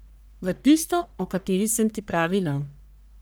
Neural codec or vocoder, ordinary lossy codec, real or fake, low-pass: codec, 44.1 kHz, 1.7 kbps, Pupu-Codec; none; fake; none